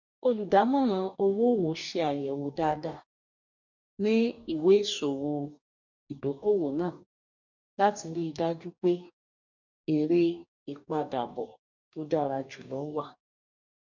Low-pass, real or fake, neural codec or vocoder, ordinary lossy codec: 7.2 kHz; fake; codec, 44.1 kHz, 2.6 kbps, DAC; none